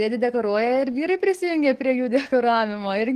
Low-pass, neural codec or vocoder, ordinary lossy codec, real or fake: 14.4 kHz; codec, 44.1 kHz, 7.8 kbps, DAC; Opus, 16 kbps; fake